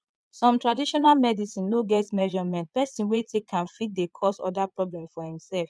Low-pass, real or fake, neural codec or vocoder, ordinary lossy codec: none; fake; vocoder, 22.05 kHz, 80 mel bands, Vocos; none